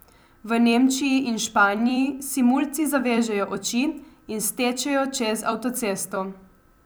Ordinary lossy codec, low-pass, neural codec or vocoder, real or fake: none; none; vocoder, 44.1 kHz, 128 mel bands every 256 samples, BigVGAN v2; fake